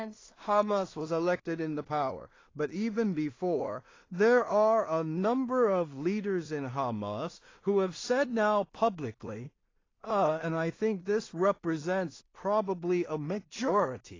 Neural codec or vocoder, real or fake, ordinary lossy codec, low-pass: codec, 16 kHz in and 24 kHz out, 0.4 kbps, LongCat-Audio-Codec, two codebook decoder; fake; AAC, 32 kbps; 7.2 kHz